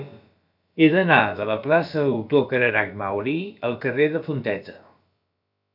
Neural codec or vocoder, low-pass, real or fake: codec, 16 kHz, about 1 kbps, DyCAST, with the encoder's durations; 5.4 kHz; fake